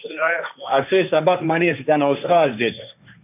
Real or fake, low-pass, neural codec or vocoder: fake; 3.6 kHz; codec, 16 kHz, 1.1 kbps, Voila-Tokenizer